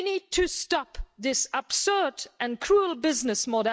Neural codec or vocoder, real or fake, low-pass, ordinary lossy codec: none; real; none; none